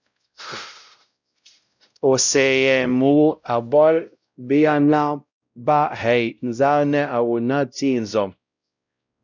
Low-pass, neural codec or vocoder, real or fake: 7.2 kHz; codec, 16 kHz, 0.5 kbps, X-Codec, WavLM features, trained on Multilingual LibriSpeech; fake